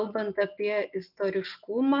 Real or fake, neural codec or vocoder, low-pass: real; none; 5.4 kHz